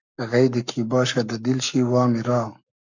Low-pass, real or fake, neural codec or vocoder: 7.2 kHz; fake; codec, 44.1 kHz, 7.8 kbps, Pupu-Codec